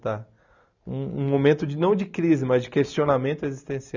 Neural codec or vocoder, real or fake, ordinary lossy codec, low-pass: none; real; none; 7.2 kHz